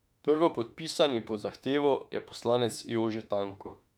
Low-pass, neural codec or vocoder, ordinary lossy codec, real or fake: 19.8 kHz; autoencoder, 48 kHz, 32 numbers a frame, DAC-VAE, trained on Japanese speech; none; fake